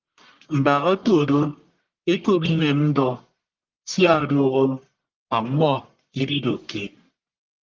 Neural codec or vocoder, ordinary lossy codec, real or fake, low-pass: codec, 44.1 kHz, 1.7 kbps, Pupu-Codec; Opus, 32 kbps; fake; 7.2 kHz